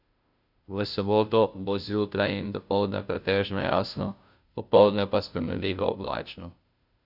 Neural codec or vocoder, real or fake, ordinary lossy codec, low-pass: codec, 16 kHz, 0.5 kbps, FunCodec, trained on Chinese and English, 25 frames a second; fake; none; 5.4 kHz